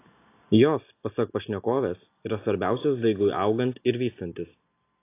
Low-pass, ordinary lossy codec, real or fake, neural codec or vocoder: 3.6 kHz; AAC, 24 kbps; real; none